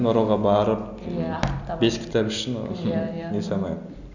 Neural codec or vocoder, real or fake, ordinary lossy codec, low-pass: none; real; none; 7.2 kHz